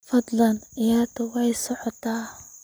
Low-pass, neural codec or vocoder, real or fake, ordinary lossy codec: none; none; real; none